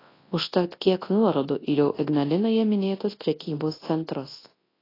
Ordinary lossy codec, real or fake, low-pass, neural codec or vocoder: AAC, 24 kbps; fake; 5.4 kHz; codec, 24 kHz, 0.9 kbps, WavTokenizer, large speech release